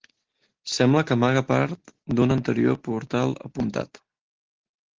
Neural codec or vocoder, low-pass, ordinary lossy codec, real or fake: none; 7.2 kHz; Opus, 16 kbps; real